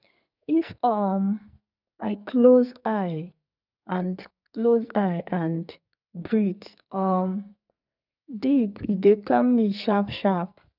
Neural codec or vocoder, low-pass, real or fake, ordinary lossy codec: codec, 44.1 kHz, 2.6 kbps, SNAC; 5.4 kHz; fake; none